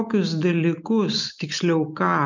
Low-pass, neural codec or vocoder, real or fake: 7.2 kHz; none; real